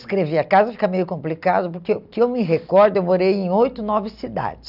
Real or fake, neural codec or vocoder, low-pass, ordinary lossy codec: real; none; 5.4 kHz; none